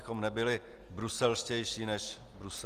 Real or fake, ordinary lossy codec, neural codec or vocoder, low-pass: real; Opus, 24 kbps; none; 14.4 kHz